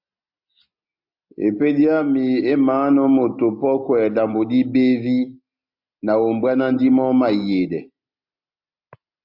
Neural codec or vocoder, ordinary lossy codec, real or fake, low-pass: none; MP3, 48 kbps; real; 5.4 kHz